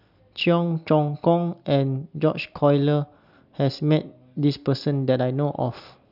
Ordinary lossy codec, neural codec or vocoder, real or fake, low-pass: none; none; real; 5.4 kHz